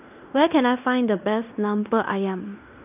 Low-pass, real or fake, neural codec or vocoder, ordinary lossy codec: 3.6 kHz; fake; codec, 16 kHz in and 24 kHz out, 0.9 kbps, LongCat-Audio-Codec, fine tuned four codebook decoder; none